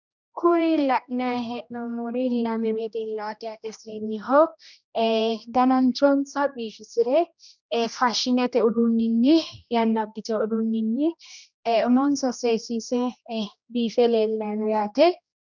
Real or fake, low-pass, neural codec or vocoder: fake; 7.2 kHz; codec, 16 kHz, 1 kbps, X-Codec, HuBERT features, trained on general audio